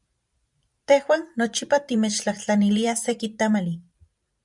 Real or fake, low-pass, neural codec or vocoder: fake; 10.8 kHz; vocoder, 44.1 kHz, 128 mel bands every 512 samples, BigVGAN v2